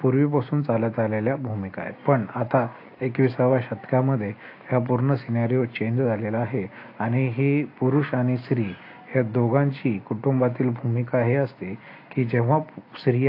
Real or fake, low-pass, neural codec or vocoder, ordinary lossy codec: real; 5.4 kHz; none; AAC, 32 kbps